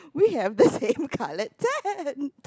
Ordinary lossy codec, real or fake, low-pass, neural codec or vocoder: none; real; none; none